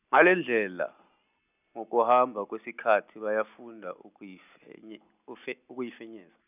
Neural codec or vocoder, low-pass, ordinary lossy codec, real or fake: none; 3.6 kHz; none; real